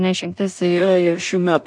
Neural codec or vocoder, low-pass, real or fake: codec, 16 kHz in and 24 kHz out, 0.4 kbps, LongCat-Audio-Codec, two codebook decoder; 9.9 kHz; fake